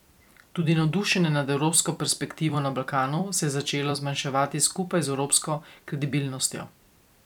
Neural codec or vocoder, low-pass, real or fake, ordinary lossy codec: vocoder, 44.1 kHz, 128 mel bands every 256 samples, BigVGAN v2; 19.8 kHz; fake; none